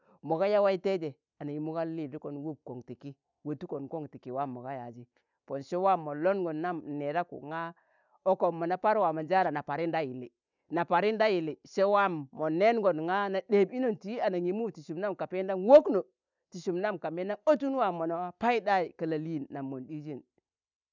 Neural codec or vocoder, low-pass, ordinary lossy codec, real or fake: none; 7.2 kHz; none; real